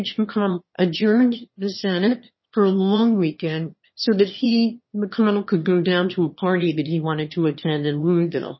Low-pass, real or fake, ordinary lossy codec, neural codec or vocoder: 7.2 kHz; fake; MP3, 24 kbps; autoencoder, 22.05 kHz, a latent of 192 numbers a frame, VITS, trained on one speaker